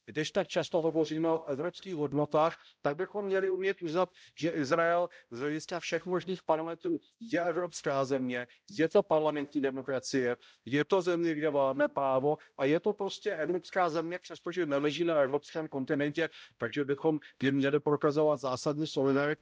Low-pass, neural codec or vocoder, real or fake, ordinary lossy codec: none; codec, 16 kHz, 0.5 kbps, X-Codec, HuBERT features, trained on balanced general audio; fake; none